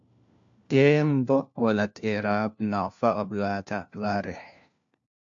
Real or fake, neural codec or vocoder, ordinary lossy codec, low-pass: fake; codec, 16 kHz, 1 kbps, FunCodec, trained on LibriTTS, 50 frames a second; AAC, 64 kbps; 7.2 kHz